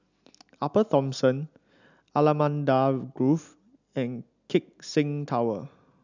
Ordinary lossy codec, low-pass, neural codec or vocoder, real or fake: none; 7.2 kHz; none; real